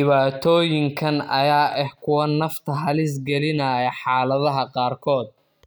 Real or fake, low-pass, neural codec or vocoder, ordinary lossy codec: real; none; none; none